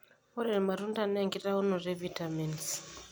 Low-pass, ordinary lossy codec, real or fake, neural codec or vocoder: none; none; real; none